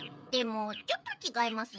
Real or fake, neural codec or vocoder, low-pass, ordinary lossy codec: fake; codec, 16 kHz, 16 kbps, FreqCodec, smaller model; none; none